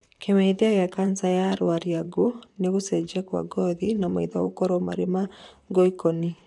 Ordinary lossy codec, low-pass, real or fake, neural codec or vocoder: none; 10.8 kHz; fake; vocoder, 44.1 kHz, 128 mel bands, Pupu-Vocoder